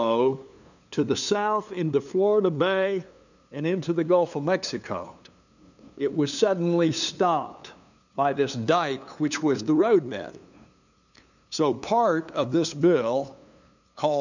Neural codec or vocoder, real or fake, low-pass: codec, 16 kHz, 2 kbps, FunCodec, trained on LibriTTS, 25 frames a second; fake; 7.2 kHz